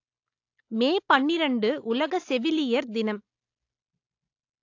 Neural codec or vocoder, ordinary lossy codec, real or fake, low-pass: none; AAC, 48 kbps; real; 7.2 kHz